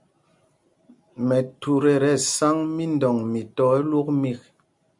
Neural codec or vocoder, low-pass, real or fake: none; 10.8 kHz; real